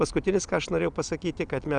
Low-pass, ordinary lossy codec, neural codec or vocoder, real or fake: 9.9 kHz; Opus, 64 kbps; none; real